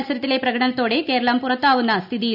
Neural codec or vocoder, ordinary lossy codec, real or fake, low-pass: none; none; real; 5.4 kHz